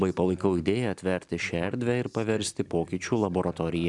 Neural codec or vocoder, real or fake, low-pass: none; real; 10.8 kHz